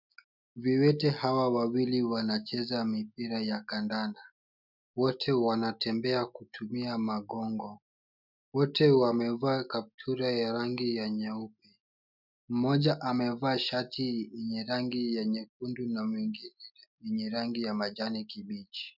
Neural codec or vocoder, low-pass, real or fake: none; 5.4 kHz; real